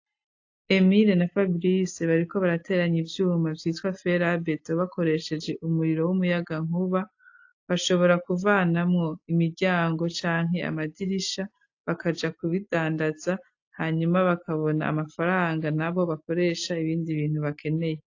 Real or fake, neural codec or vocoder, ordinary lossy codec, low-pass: real; none; AAC, 48 kbps; 7.2 kHz